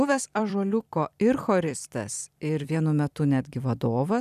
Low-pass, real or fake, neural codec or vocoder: 14.4 kHz; fake; vocoder, 44.1 kHz, 128 mel bands every 256 samples, BigVGAN v2